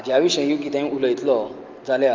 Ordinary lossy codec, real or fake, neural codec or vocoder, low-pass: Opus, 32 kbps; real; none; 7.2 kHz